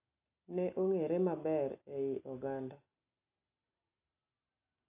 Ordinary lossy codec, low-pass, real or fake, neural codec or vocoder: AAC, 32 kbps; 3.6 kHz; real; none